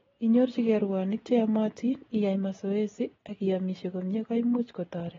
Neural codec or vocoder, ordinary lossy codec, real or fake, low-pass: none; AAC, 24 kbps; real; 7.2 kHz